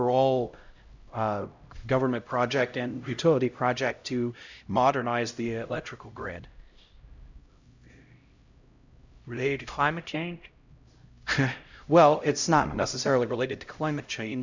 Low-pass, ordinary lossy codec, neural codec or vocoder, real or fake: 7.2 kHz; Opus, 64 kbps; codec, 16 kHz, 0.5 kbps, X-Codec, HuBERT features, trained on LibriSpeech; fake